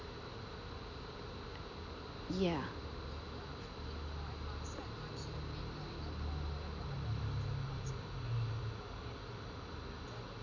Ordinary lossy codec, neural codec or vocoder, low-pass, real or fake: none; none; 7.2 kHz; real